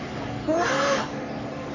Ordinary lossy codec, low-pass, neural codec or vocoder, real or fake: none; 7.2 kHz; codec, 44.1 kHz, 3.4 kbps, Pupu-Codec; fake